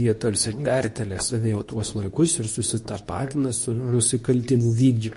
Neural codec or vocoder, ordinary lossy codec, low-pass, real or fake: codec, 24 kHz, 0.9 kbps, WavTokenizer, medium speech release version 2; MP3, 48 kbps; 10.8 kHz; fake